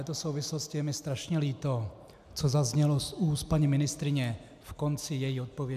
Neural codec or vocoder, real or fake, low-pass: none; real; 14.4 kHz